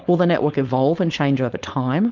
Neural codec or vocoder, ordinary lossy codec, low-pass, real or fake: codec, 16 kHz, 4.8 kbps, FACodec; Opus, 32 kbps; 7.2 kHz; fake